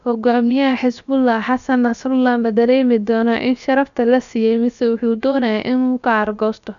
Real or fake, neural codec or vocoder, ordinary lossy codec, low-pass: fake; codec, 16 kHz, about 1 kbps, DyCAST, with the encoder's durations; none; 7.2 kHz